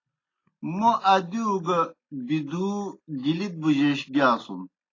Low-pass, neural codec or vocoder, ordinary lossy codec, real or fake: 7.2 kHz; none; AAC, 32 kbps; real